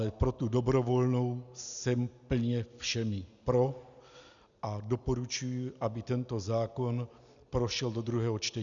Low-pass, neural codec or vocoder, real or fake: 7.2 kHz; none; real